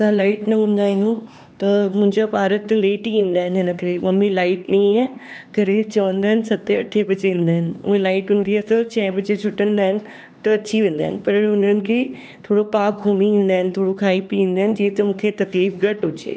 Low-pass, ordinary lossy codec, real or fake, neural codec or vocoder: none; none; fake; codec, 16 kHz, 2 kbps, X-Codec, HuBERT features, trained on LibriSpeech